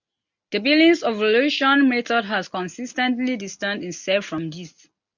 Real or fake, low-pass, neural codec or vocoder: real; 7.2 kHz; none